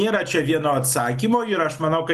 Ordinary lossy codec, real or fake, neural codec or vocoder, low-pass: Opus, 32 kbps; real; none; 14.4 kHz